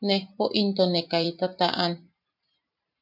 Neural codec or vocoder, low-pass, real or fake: vocoder, 44.1 kHz, 128 mel bands every 512 samples, BigVGAN v2; 5.4 kHz; fake